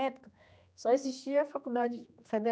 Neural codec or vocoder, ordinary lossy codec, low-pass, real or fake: codec, 16 kHz, 2 kbps, X-Codec, HuBERT features, trained on general audio; none; none; fake